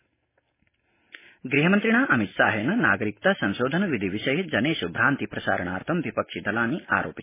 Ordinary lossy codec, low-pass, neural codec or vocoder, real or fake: MP3, 16 kbps; 3.6 kHz; none; real